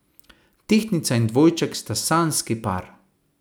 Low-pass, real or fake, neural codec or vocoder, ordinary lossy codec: none; real; none; none